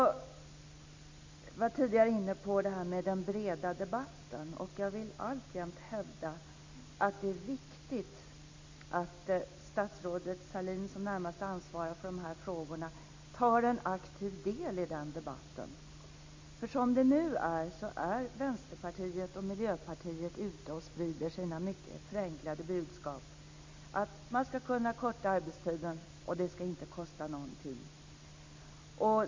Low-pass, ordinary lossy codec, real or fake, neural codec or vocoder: 7.2 kHz; MP3, 64 kbps; real; none